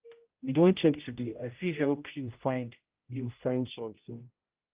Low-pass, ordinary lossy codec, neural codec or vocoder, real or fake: 3.6 kHz; Opus, 24 kbps; codec, 16 kHz, 0.5 kbps, X-Codec, HuBERT features, trained on general audio; fake